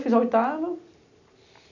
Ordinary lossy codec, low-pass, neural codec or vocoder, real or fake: none; 7.2 kHz; none; real